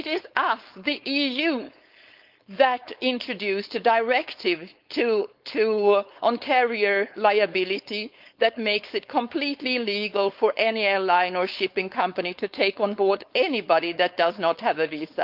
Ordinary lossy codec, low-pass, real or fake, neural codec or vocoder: Opus, 24 kbps; 5.4 kHz; fake; codec, 16 kHz, 4.8 kbps, FACodec